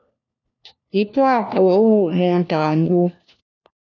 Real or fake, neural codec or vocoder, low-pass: fake; codec, 16 kHz, 1 kbps, FunCodec, trained on LibriTTS, 50 frames a second; 7.2 kHz